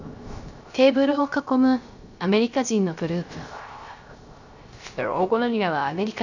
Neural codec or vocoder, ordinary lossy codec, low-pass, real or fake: codec, 16 kHz, 0.3 kbps, FocalCodec; none; 7.2 kHz; fake